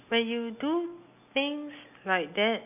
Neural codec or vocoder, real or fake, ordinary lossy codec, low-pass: none; real; none; 3.6 kHz